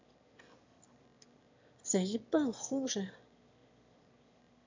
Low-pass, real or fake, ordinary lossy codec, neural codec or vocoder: 7.2 kHz; fake; MP3, 64 kbps; autoencoder, 22.05 kHz, a latent of 192 numbers a frame, VITS, trained on one speaker